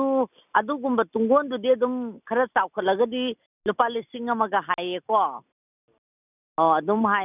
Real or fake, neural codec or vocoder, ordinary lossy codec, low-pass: real; none; none; 3.6 kHz